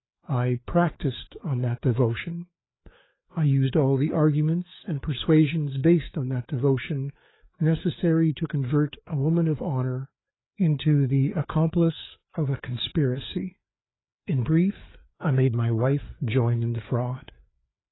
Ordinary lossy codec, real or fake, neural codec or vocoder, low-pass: AAC, 16 kbps; fake; codec, 16 kHz, 4 kbps, FreqCodec, larger model; 7.2 kHz